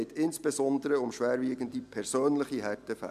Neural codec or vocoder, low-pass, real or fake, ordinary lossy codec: none; 14.4 kHz; real; MP3, 96 kbps